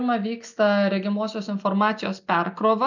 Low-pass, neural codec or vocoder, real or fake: 7.2 kHz; none; real